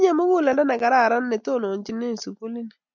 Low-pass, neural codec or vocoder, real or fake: 7.2 kHz; none; real